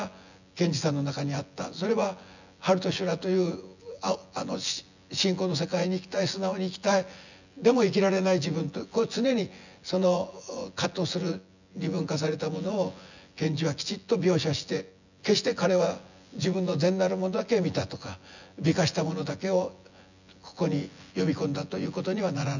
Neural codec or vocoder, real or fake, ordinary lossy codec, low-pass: vocoder, 24 kHz, 100 mel bands, Vocos; fake; none; 7.2 kHz